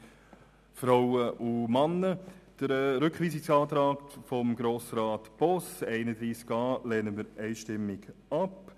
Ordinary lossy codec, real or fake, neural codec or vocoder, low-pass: none; real; none; 14.4 kHz